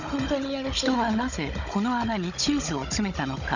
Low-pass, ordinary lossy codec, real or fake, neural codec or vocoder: 7.2 kHz; none; fake; codec, 16 kHz, 16 kbps, FunCodec, trained on Chinese and English, 50 frames a second